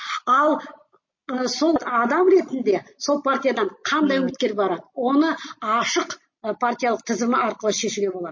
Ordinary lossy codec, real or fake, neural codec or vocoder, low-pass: MP3, 32 kbps; real; none; 7.2 kHz